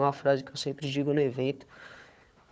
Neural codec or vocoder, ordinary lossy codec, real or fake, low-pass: codec, 16 kHz, 4 kbps, FunCodec, trained on Chinese and English, 50 frames a second; none; fake; none